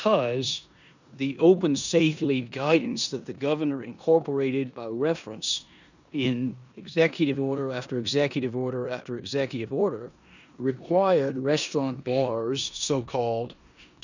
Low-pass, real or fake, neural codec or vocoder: 7.2 kHz; fake; codec, 16 kHz in and 24 kHz out, 0.9 kbps, LongCat-Audio-Codec, four codebook decoder